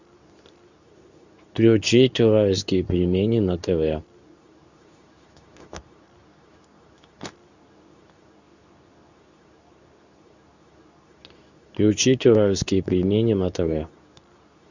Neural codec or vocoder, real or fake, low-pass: codec, 24 kHz, 0.9 kbps, WavTokenizer, medium speech release version 2; fake; 7.2 kHz